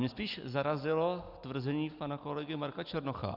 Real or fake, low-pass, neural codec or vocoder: real; 5.4 kHz; none